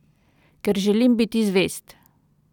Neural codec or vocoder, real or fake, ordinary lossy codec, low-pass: none; real; none; 19.8 kHz